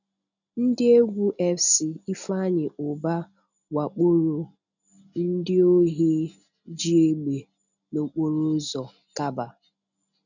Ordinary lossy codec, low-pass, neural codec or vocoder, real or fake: none; 7.2 kHz; none; real